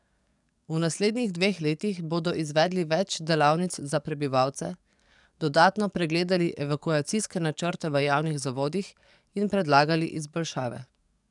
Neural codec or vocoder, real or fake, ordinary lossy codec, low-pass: codec, 44.1 kHz, 7.8 kbps, DAC; fake; none; 10.8 kHz